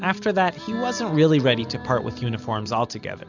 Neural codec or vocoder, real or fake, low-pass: none; real; 7.2 kHz